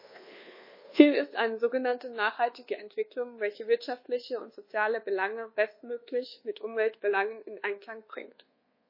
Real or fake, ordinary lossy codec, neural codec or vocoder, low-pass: fake; MP3, 24 kbps; codec, 24 kHz, 1.2 kbps, DualCodec; 5.4 kHz